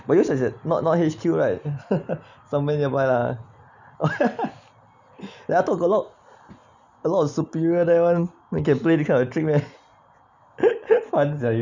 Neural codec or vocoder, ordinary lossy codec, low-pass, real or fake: none; none; 7.2 kHz; real